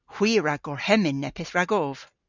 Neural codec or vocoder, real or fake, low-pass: none; real; 7.2 kHz